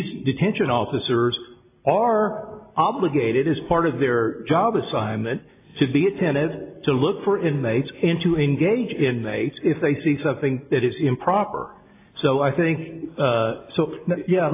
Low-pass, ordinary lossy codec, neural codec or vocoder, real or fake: 3.6 kHz; AAC, 24 kbps; none; real